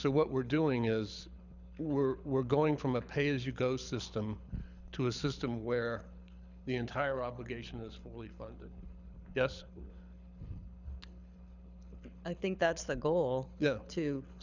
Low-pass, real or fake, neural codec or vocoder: 7.2 kHz; fake; codec, 24 kHz, 6 kbps, HILCodec